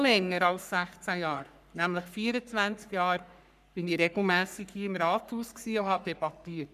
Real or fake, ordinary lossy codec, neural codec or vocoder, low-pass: fake; none; codec, 44.1 kHz, 3.4 kbps, Pupu-Codec; 14.4 kHz